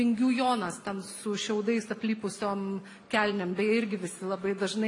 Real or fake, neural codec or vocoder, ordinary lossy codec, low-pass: real; none; AAC, 48 kbps; 10.8 kHz